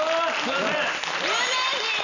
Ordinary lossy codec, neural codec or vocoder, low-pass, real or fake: none; none; 7.2 kHz; real